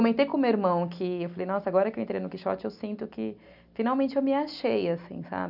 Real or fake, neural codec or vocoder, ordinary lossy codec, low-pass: real; none; none; 5.4 kHz